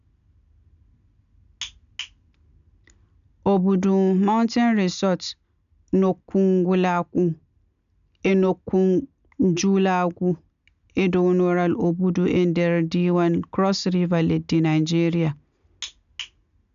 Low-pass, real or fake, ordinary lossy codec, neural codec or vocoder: 7.2 kHz; real; none; none